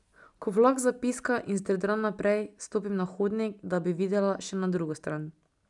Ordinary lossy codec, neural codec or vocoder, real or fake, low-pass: none; none; real; 10.8 kHz